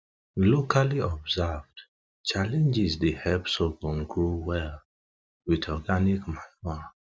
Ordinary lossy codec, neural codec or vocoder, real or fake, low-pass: none; none; real; none